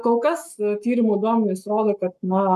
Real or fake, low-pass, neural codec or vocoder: fake; 14.4 kHz; codec, 44.1 kHz, 7.8 kbps, Pupu-Codec